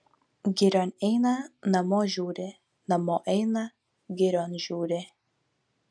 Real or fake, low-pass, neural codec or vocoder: real; 9.9 kHz; none